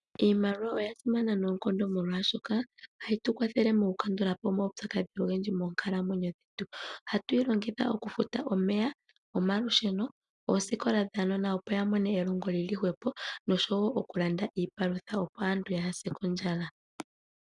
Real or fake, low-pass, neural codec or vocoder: real; 10.8 kHz; none